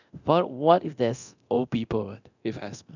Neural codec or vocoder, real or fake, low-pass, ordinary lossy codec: codec, 24 kHz, 0.9 kbps, DualCodec; fake; 7.2 kHz; none